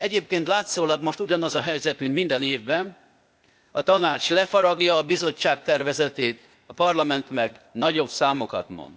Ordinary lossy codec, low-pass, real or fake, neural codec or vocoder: none; none; fake; codec, 16 kHz, 0.8 kbps, ZipCodec